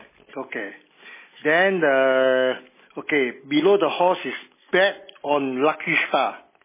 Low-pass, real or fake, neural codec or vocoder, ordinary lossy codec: 3.6 kHz; real; none; MP3, 16 kbps